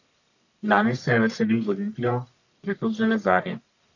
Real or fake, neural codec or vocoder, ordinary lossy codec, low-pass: fake; codec, 44.1 kHz, 1.7 kbps, Pupu-Codec; none; 7.2 kHz